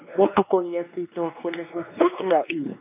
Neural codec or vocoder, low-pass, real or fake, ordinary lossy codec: codec, 16 kHz, 2 kbps, X-Codec, WavLM features, trained on Multilingual LibriSpeech; 3.6 kHz; fake; none